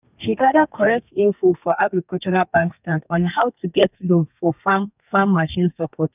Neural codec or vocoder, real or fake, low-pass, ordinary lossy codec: codec, 32 kHz, 1.9 kbps, SNAC; fake; 3.6 kHz; none